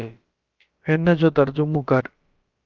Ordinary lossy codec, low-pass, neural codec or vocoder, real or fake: Opus, 24 kbps; 7.2 kHz; codec, 16 kHz, about 1 kbps, DyCAST, with the encoder's durations; fake